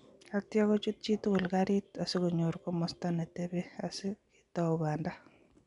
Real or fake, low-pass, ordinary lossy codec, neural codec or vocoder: real; 10.8 kHz; none; none